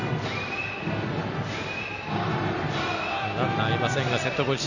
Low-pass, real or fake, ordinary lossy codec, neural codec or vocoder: 7.2 kHz; real; none; none